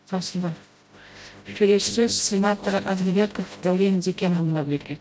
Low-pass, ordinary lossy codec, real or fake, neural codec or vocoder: none; none; fake; codec, 16 kHz, 0.5 kbps, FreqCodec, smaller model